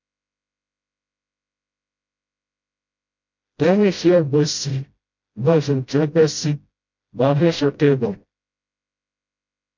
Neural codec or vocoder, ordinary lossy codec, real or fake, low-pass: codec, 16 kHz, 0.5 kbps, FreqCodec, smaller model; MP3, 48 kbps; fake; 7.2 kHz